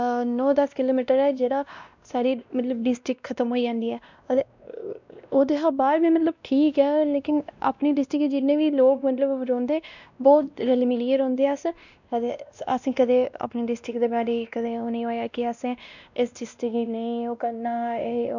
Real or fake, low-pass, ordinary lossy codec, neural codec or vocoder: fake; 7.2 kHz; none; codec, 16 kHz, 1 kbps, X-Codec, WavLM features, trained on Multilingual LibriSpeech